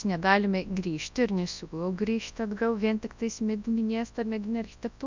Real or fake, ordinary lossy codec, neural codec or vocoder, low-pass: fake; MP3, 64 kbps; codec, 24 kHz, 0.9 kbps, WavTokenizer, large speech release; 7.2 kHz